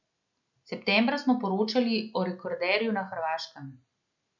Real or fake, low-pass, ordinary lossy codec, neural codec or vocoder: real; 7.2 kHz; none; none